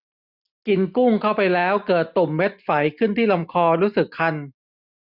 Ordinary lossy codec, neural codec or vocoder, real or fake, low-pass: none; none; real; 5.4 kHz